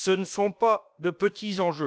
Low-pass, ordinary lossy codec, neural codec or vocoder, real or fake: none; none; codec, 16 kHz, about 1 kbps, DyCAST, with the encoder's durations; fake